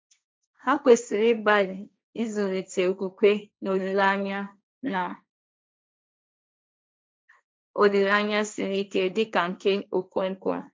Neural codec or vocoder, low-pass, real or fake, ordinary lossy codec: codec, 16 kHz, 1.1 kbps, Voila-Tokenizer; none; fake; none